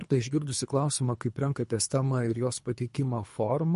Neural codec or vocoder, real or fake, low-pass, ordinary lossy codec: codec, 24 kHz, 3 kbps, HILCodec; fake; 10.8 kHz; MP3, 48 kbps